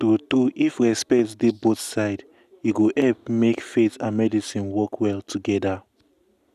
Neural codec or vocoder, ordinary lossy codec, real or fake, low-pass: none; none; real; 14.4 kHz